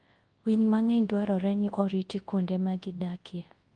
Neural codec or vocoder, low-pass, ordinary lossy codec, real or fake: codec, 24 kHz, 0.9 kbps, WavTokenizer, large speech release; 9.9 kHz; Opus, 24 kbps; fake